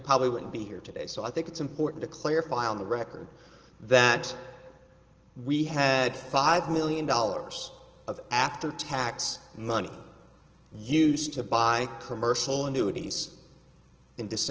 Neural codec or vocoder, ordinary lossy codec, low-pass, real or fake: none; Opus, 16 kbps; 7.2 kHz; real